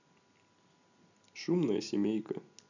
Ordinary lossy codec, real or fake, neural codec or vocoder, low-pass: none; real; none; 7.2 kHz